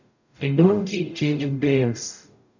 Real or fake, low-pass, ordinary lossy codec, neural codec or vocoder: fake; 7.2 kHz; none; codec, 44.1 kHz, 0.9 kbps, DAC